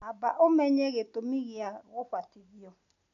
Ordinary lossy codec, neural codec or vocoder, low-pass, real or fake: none; none; 7.2 kHz; real